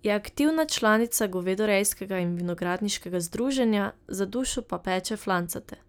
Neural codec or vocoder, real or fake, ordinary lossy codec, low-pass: none; real; none; none